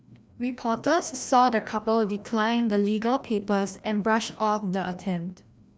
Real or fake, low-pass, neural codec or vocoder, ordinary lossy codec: fake; none; codec, 16 kHz, 1 kbps, FreqCodec, larger model; none